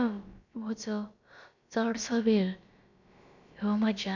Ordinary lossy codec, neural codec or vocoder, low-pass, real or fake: none; codec, 16 kHz, about 1 kbps, DyCAST, with the encoder's durations; 7.2 kHz; fake